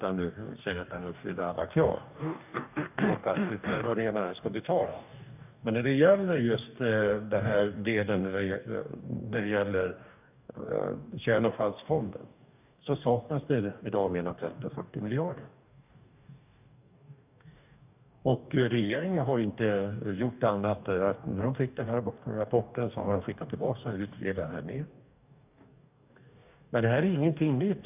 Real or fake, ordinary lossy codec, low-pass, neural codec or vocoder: fake; none; 3.6 kHz; codec, 44.1 kHz, 2.6 kbps, DAC